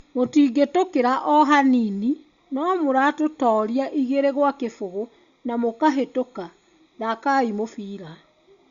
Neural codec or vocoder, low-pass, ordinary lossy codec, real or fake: none; 7.2 kHz; Opus, 64 kbps; real